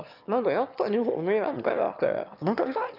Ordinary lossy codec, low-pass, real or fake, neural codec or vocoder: none; 5.4 kHz; fake; autoencoder, 22.05 kHz, a latent of 192 numbers a frame, VITS, trained on one speaker